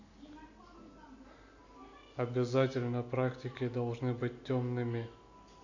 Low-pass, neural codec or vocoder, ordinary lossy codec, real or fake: 7.2 kHz; none; none; real